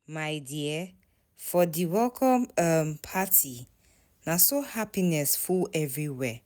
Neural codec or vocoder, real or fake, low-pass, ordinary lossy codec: none; real; none; none